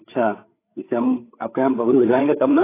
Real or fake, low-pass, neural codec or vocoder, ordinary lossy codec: fake; 3.6 kHz; codec, 16 kHz, 8 kbps, FreqCodec, larger model; AAC, 24 kbps